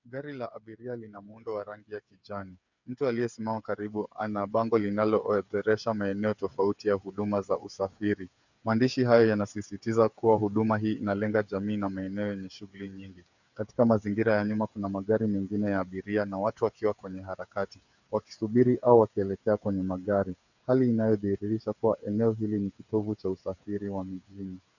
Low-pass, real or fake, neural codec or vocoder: 7.2 kHz; fake; codec, 16 kHz, 8 kbps, FreqCodec, smaller model